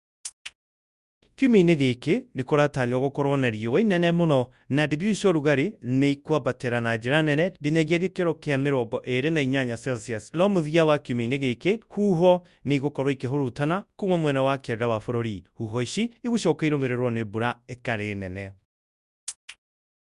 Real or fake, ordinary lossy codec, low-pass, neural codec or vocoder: fake; Opus, 64 kbps; 10.8 kHz; codec, 24 kHz, 0.9 kbps, WavTokenizer, large speech release